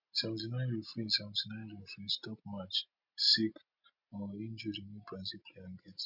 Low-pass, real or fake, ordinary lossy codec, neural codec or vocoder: 5.4 kHz; real; MP3, 48 kbps; none